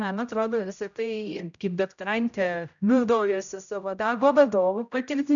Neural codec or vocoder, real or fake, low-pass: codec, 16 kHz, 0.5 kbps, X-Codec, HuBERT features, trained on general audio; fake; 7.2 kHz